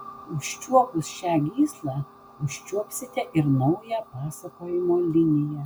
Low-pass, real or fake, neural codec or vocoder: 19.8 kHz; real; none